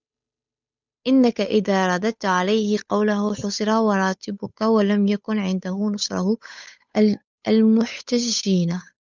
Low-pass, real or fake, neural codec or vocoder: 7.2 kHz; fake; codec, 16 kHz, 8 kbps, FunCodec, trained on Chinese and English, 25 frames a second